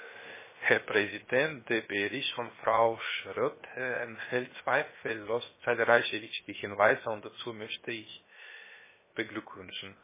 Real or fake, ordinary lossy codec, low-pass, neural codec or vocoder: fake; MP3, 16 kbps; 3.6 kHz; codec, 16 kHz, 0.7 kbps, FocalCodec